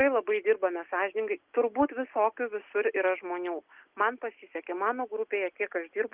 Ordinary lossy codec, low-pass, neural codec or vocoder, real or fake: Opus, 32 kbps; 3.6 kHz; none; real